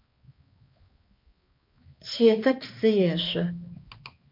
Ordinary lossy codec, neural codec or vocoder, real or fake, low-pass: MP3, 32 kbps; codec, 16 kHz, 2 kbps, X-Codec, HuBERT features, trained on balanced general audio; fake; 5.4 kHz